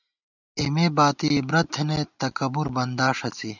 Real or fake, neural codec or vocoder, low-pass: real; none; 7.2 kHz